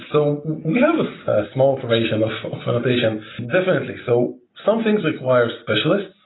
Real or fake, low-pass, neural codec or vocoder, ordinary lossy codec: real; 7.2 kHz; none; AAC, 16 kbps